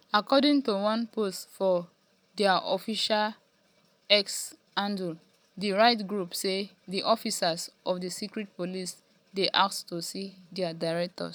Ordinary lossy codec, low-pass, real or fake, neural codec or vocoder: none; none; real; none